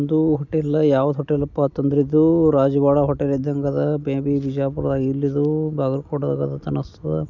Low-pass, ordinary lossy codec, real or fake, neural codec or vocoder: 7.2 kHz; none; real; none